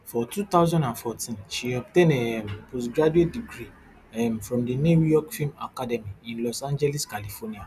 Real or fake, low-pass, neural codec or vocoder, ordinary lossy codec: real; 14.4 kHz; none; none